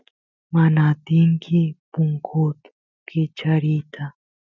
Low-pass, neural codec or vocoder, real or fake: 7.2 kHz; none; real